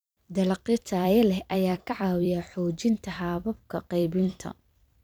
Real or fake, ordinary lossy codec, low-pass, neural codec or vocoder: fake; none; none; vocoder, 44.1 kHz, 128 mel bands every 256 samples, BigVGAN v2